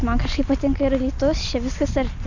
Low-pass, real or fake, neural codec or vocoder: 7.2 kHz; real; none